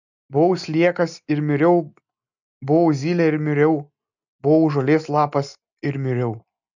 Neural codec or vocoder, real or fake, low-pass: none; real; 7.2 kHz